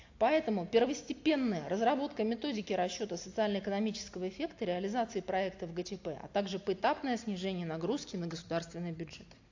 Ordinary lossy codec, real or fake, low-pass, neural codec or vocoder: AAC, 48 kbps; real; 7.2 kHz; none